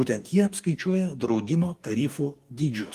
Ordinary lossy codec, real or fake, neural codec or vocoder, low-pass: Opus, 32 kbps; fake; codec, 44.1 kHz, 2.6 kbps, DAC; 14.4 kHz